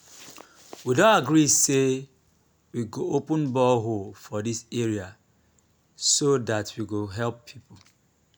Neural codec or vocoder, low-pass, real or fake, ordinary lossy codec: none; none; real; none